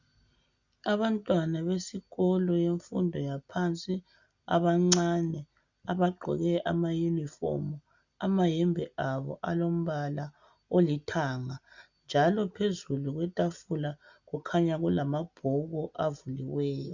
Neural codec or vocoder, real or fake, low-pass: none; real; 7.2 kHz